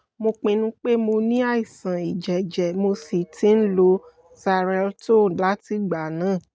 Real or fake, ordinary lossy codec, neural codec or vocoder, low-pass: real; none; none; none